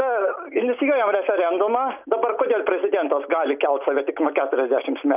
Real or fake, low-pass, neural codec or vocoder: real; 3.6 kHz; none